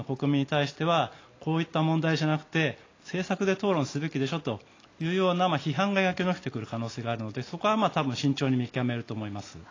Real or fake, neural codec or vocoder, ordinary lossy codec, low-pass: real; none; AAC, 32 kbps; 7.2 kHz